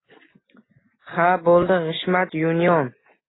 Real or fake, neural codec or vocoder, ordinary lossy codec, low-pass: real; none; AAC, 16 kbps; 7.2 kHz